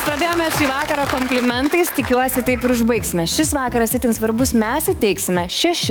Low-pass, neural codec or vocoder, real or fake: 19.8 kHz; codec, 44.1 kHz, 7.8 kbps, DAC; fake